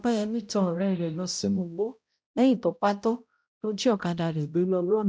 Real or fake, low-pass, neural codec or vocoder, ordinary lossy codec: fake; none; codec, 16 kHz, 0.5 kbps, X-Codec, HuBERT features, trained on balanced general audio; none